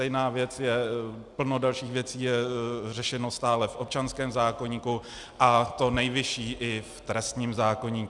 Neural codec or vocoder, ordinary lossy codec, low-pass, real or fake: none; Opus, 64 kbps; 10.8 kHz; real